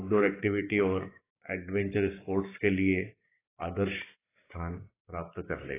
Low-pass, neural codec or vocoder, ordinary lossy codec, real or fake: 3.6 kHz; codec, 16 kHz, 6 kbps, DAC; AAC, 16 kbps; fake